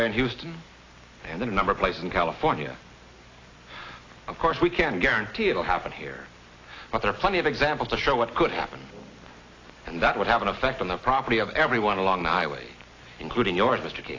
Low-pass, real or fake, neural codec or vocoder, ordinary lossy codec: 7.2 kHz; real; none; AAC, 32 kbps